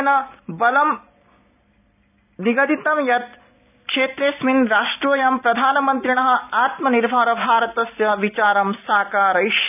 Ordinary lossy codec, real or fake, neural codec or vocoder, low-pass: none; real; none; 3.6 kHz